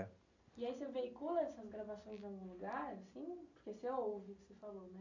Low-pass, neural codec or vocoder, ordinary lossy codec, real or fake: 7.2 kHz; none; none; real